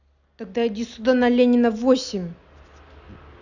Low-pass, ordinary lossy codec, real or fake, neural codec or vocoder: 7.2 kHz; none; real; none